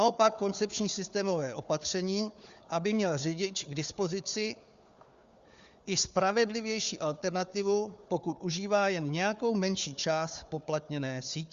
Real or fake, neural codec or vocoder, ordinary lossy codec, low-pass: fake; codec, 16 kHz, 4 kbps, FunCodec, trained on Chinese and English, 50 frames a second; Opus, 64 kbps; 7.2 kHz